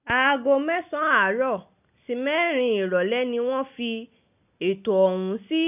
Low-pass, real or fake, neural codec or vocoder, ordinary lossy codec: 3.6 kHz; real; none; none